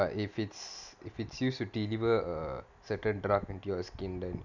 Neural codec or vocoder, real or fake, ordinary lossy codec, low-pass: none; real; none; 7.2 kHz